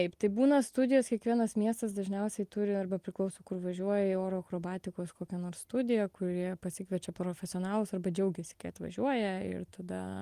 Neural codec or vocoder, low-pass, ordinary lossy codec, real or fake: none; 14.4 kHz; Opus, 24 kbps; real